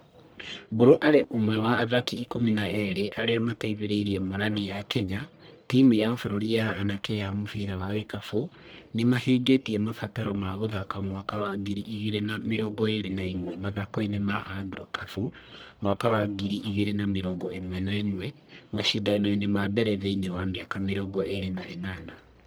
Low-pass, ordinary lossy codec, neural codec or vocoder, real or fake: none; none; codec, 44.1 kHz, 1.7 kbps, Pupu-Codec; fake